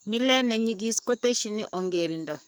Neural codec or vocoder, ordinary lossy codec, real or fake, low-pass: codec, 44.1 kHz, 2.6 kbps, SNAC; none; fake; none